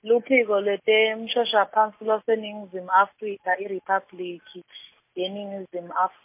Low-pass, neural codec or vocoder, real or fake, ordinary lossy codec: 3.6 kHz; none; real; MP3, 24 kbps